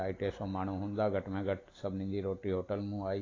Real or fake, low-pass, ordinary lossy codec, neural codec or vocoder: real; 7.2 kHz; AAC, 32 kbps; none